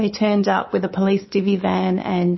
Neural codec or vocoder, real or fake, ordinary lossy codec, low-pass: codec, 24 kHz, 3.1 kbps, DualCodec; fake; MP3, 24 kbps; 7.2 kHz